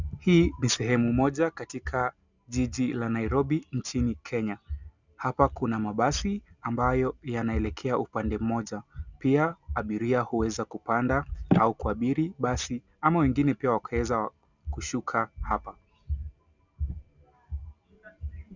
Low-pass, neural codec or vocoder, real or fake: 7.2 kHz; none; real